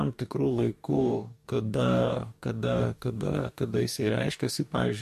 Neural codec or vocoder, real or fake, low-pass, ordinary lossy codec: codec, 44.1 kHz, 2.6 kbps, DAC; fake; 14.4 kHz; MP3, 64 kbps